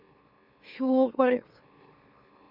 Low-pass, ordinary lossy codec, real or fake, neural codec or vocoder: 5.4 kHz; Opus, 64 kbps; fake; autoencoder, 44.1 kHz, a latent of 192 numbers a frame, MeloTTS